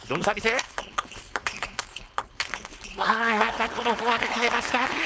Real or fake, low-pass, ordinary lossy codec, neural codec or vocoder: fake; none; none; codec, 16 kHz, 4.8 kbps, FACodec